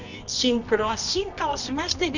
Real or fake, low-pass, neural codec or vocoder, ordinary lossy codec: fake; 7.2 kHz; codec, 24 kHz, 0.9 kbps, WavTokenizer, medium music audio release; none